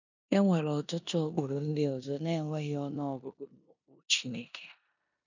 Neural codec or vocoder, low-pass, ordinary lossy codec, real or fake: codec, 16 kHz in and 24 kHz out, 0.9 kbps, LongCat-Audio-Codec, four codebook decoder; 7.2 kHz; none; fake